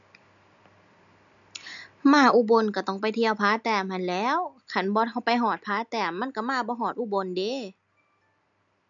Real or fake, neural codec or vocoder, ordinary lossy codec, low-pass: real; none; none; 7.2 kHz